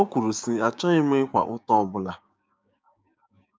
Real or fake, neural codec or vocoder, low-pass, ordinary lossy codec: fake; codec, 16 kHz, 6 kbps, DAC; none; none